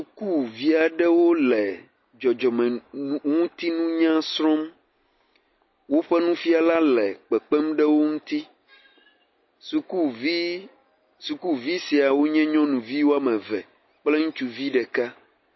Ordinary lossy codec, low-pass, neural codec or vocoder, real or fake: MP3, 24 kbps; 7.2 kHz; none; real